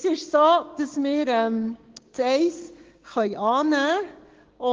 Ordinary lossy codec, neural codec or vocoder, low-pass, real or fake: Opus, 16 kbps; codec, 16 kHz, 6 kbps, DAC; 7.2 kHz; fake